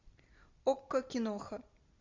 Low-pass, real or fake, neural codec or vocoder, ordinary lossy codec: 7.2 kHz; real; none; Opus, 64 kbps